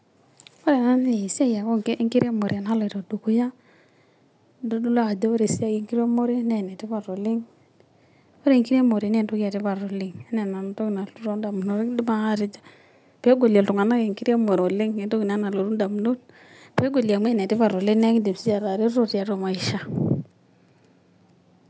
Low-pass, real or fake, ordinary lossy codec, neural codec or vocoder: none; real; none; none